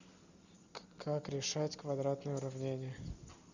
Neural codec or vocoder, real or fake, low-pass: none; real; 7.2 kHz